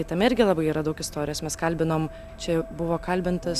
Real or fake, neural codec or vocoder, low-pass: real; none; 14.4 kHz